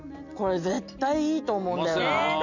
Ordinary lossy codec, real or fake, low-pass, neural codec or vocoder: none; real; 7.2 kHz; none